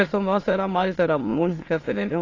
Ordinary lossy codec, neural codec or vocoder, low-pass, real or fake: AAC, 32 kbps; autoencoder, 22.05 kHz, a latent of 192 numbers a frame, VITS, trained on many speakers; 7.2 kHz; fake